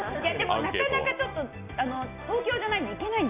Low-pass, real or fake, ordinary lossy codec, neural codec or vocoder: 3.6 kHz; real; none; none